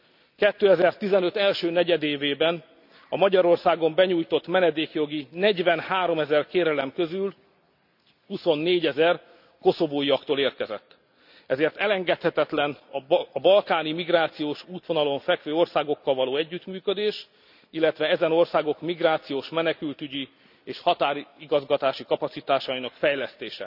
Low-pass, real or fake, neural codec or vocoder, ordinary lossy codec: 5.4 kHz; real; none; none